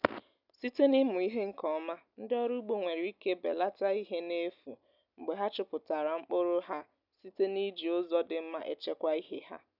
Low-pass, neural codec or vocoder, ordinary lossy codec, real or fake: 5.4 kHz; none; none; real